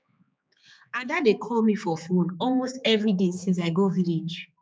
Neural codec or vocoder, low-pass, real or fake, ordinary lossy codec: codec, 16 kHz, 4 kbps, X-Codec, HuBERT features, trained on general audio; none; fake; none